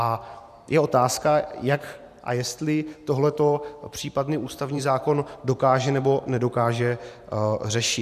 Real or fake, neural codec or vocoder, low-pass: fake; vocoder, 44.1 kHz, 128 mel bands every 512 samples, BigVGAN v2; 14.4 kHz